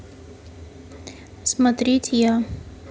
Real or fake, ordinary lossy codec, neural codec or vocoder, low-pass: real; none; none; none